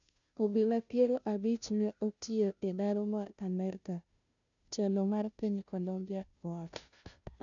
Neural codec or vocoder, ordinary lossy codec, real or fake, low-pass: codec, 16 kHz, 0.5 kbps, FunCodec, trained on Chinese and English, 25 frames a second; none; fake; 7.2 kHz